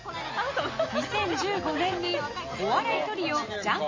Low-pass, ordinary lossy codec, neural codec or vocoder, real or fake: 7.2 kHz; MP3, 32 kbps; none; real